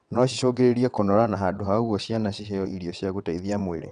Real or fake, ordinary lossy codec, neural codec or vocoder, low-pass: fake; none; vocoder, 22.05 kHz, 80 mel bands, WaveNeXt; 9.9 kHz